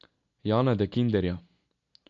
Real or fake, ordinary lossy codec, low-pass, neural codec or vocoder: real; AAC, 64 kbps; 7.2 kHz; none